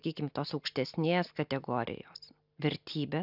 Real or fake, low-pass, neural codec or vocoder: real; 5.4 kHz; none